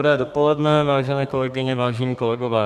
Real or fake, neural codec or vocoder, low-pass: fake; codec, 32 kHz, 1.9 kbps, SNAC; 14.4 kHz